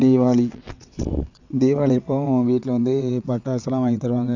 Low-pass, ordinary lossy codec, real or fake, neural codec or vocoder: 7.2 kHz; none; fake; vocoder, 22.05 kHz, 80 mel bands, WaveNeXt